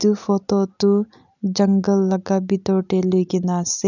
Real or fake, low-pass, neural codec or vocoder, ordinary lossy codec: real; 7.2 kHz; none; none